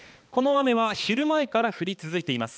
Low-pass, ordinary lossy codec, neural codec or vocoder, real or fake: none; none; codec, 16 kHz, 2 kbps, X-Codec, HuBERT features, trained on balanced general audio; fake